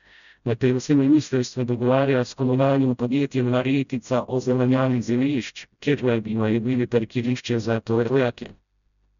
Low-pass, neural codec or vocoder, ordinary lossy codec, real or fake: 7.2 kHz; codec, 16 kHz, 0.5 kbps, FreqCodec, smaller model; none; fake